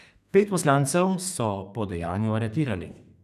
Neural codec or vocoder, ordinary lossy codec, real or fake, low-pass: codec, 32 kHz, 1.9 kbps, SNAC; none; fake; 14.4 kHz